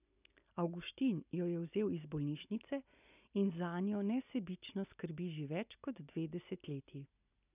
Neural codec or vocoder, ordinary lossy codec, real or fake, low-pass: none; none; real; 3.6 kHz